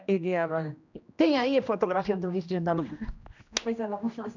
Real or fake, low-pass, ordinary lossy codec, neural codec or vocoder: fake; 7.2 kHz; none; codec, 16 kHz, 1 kbps, X-Codec, HuBERT features, trained on general audio